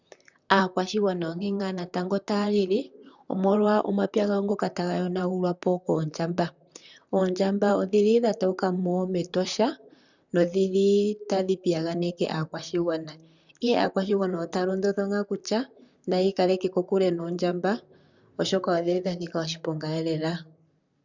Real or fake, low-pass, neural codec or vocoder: fake; 7.2 kHz; vocoder, 44.1 kHz, 128 mel bands, Pupu-Vocoder